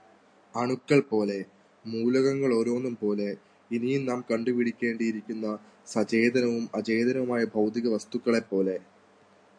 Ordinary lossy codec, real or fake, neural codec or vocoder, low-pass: AAC, 64 kbps; real; none; 9.9 kHz